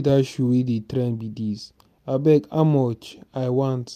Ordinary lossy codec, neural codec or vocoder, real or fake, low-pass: none; none; real; 14.4 kHz